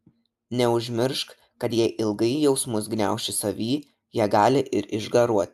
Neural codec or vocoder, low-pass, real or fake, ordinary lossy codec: none; 14.4 kHz; real; AAC, 96 kbps